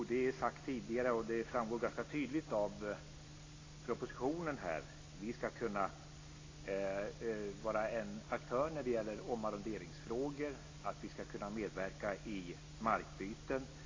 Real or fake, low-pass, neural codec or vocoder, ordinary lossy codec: real; 7.2 kHz; none; AAC, 32 kbps